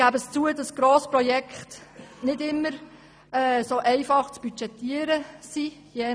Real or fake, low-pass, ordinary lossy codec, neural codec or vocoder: real; none; none; none